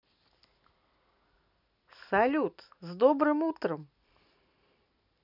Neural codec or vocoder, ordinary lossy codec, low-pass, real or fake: none; none; 5.4 kHz; real